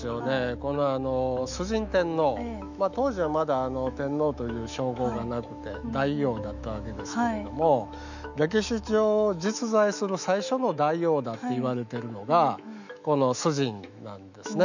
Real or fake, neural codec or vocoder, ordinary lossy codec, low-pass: fake; autoencoder, 48 kHz, 128 numbers a frame, DAC-VAE, trained on Japanese speech; none; 7.2 kHz